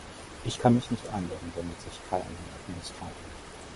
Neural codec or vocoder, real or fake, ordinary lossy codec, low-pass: vocoder, 44.1 kHz, 128 mel bands, Pupu-Vocoder; fake; MP3, 48 kbps; 14.4 kHz